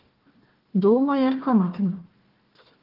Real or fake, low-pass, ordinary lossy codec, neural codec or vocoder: fake; 5.4 kHz; Opus, 16 kbps; codec, 16 kHz, 1 kbps, FunCodec, trained on Chinese and English, 50 frames a second